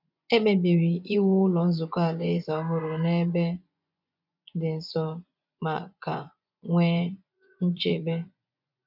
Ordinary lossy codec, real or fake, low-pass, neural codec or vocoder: none; fake; 5.4 kHz; vocoder, 24 kHz, 100 mel bands, Vocos